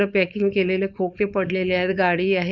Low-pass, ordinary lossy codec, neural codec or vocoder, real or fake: 7.2 kHz; none; codec, 16 kHz, 4.8 kbps, FACodec; fake